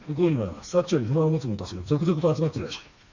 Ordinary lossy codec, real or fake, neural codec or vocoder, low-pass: Opus, 64 kbps; fake; codec, 16 kHz, 2 kbps, FreqCodec, smaller model; 7.2 kHz